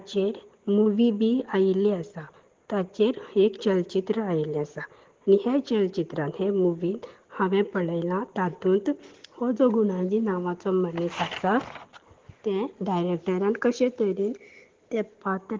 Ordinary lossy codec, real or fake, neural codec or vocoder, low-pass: Opus, 16 kbps; real; none; 7.2 kHz